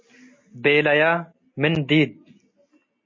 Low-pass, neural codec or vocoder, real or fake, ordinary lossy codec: 7.2 kHz; none; real; MP3, 32 kbps